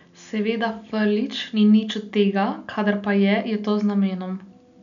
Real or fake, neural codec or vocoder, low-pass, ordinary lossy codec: real; none; 7.2 kHz; none